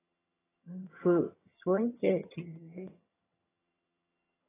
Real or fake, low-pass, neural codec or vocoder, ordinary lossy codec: fake; 3.6 kHz; vocoder, 22.05 kHz, 80 mel bands, HiFi-GAN; AAC, 16 kbps